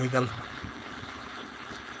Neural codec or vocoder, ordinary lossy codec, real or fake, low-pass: codec, 16 kHz, 4.8 kbps, FACodec; none; fake; none